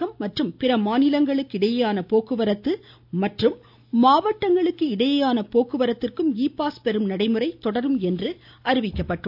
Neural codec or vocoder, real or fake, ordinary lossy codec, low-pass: none; real; AAC, 48 kbps; 5.4 kHz